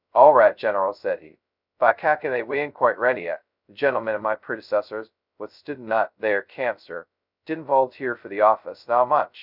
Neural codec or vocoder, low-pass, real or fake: codec, 16 kHz, 0.2 kbps, FocalCodec; 5.4 kHz; fake